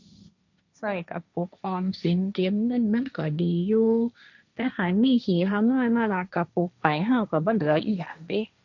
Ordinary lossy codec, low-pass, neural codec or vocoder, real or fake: none; 7.2 kHz; codec, 16 kHz, 1.1 kbps, Voila-Tokenizer; fake